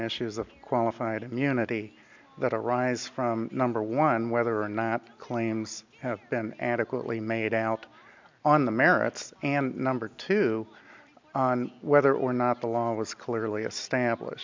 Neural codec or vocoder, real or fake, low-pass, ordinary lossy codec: none; real; 7.2 kHz; MP3, 64 kbps